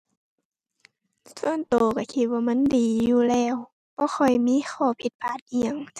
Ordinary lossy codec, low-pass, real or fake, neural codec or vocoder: none; 14.4 kHz; real; none